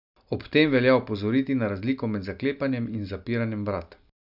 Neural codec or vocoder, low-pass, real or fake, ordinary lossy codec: none; 5.4 kHz; real; none